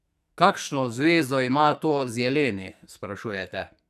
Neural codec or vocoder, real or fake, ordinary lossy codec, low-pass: codec, 44.1 kHz, 2.6 kbps, SNAC; fake; none; 14.4 kHz